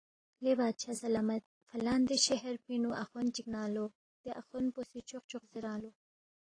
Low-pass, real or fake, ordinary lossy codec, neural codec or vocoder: 9.9 kHz; real; AAC, 32 kbps; none